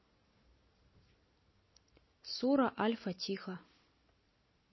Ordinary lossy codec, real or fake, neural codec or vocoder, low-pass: MP3, 24 kbps; real; none; 7.2 kHz